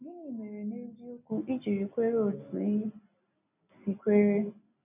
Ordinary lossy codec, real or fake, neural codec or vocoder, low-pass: none; real; none; 3.6 kHz